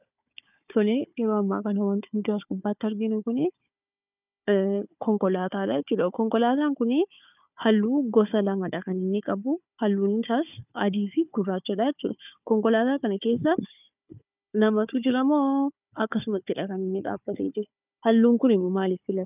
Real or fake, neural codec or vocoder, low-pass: fake; codec, 16 kHz, 4 kbps, FunCodec, trained on Chinese and English, 50 frames a second; 3.6 kHz